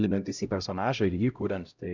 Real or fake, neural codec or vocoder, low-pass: fake; codec, 16 kHz, 0.5 kbps, X-Codec, HuBERT features, trained on LibriSpeech; 7.2 kHz